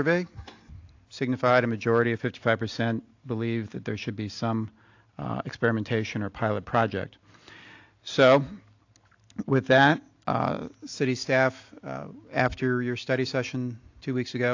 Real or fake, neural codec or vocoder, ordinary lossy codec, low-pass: real; none; AAC, 48 kbps; 7.2 kHz